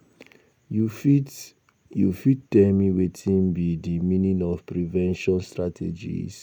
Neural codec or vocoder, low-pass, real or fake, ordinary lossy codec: none; none; real; none